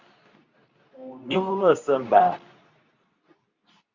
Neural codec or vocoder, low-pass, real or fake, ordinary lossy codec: codec, 24 kHz, 0.9 kbps, WavTokenizer, medium speech release version 2; 7.2 kHz; fake; Opus, 64 kbps